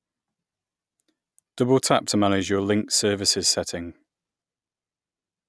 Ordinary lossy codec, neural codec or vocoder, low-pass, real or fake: none; none; none; real